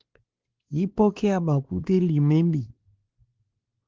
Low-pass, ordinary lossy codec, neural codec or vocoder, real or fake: 7.2 kHz; Opus, 16 kbps; codec, 16 kHz, 2 kbps, X-Codec, WavLM features, trained on Multilingual LibriSpeech; fake